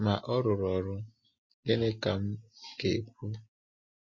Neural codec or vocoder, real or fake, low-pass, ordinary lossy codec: none; real; 7.2 kHz; MP3, 32 kbps